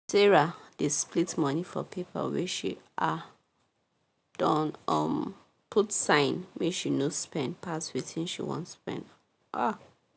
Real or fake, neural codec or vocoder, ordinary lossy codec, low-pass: real; none; none; none